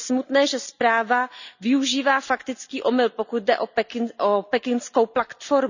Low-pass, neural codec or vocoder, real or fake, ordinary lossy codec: 7.2 kHz; none; real; none